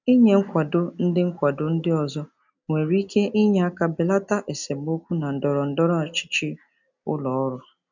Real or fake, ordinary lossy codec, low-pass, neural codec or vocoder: real; none; 7.2 kHz; none